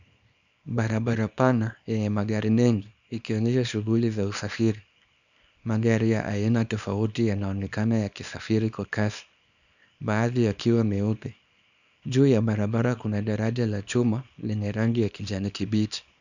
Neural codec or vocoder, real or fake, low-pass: codec, 24 kHz, 0.9 kbps, WavTokenizer, small release; fake; 7.2 kHz